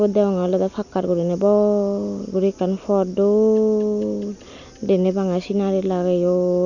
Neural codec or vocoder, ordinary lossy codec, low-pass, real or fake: none; none; 7.2 kHz; real